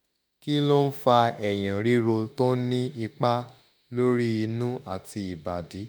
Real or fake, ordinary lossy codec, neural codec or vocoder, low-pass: fake; none; autoencoder, 48 kHz, 32 numbers a frame, DAC-VAE, trained on Japanese speech; none